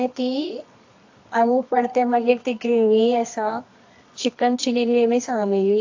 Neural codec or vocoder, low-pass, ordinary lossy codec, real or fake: codec, 24 kHz, 0.9 kbps, WavTokenizer, medium music audio release; 7.2 kHz; AAC, 48 kbps; fake